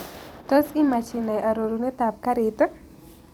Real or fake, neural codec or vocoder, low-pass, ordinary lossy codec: fake; vocoder, 44.1 kHz, 128 mel bands every 256 samples, BigVGAN v2; none; none